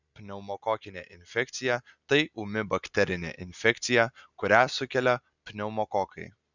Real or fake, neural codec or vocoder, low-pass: real; none; 7.2 kHz